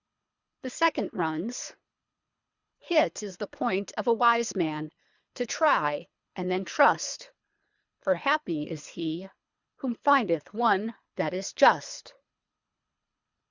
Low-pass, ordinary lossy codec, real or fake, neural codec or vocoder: 7.2 kHz; Opus, 64 kbps; fake; codec, 24 kHz, 3 kbps, HILCodec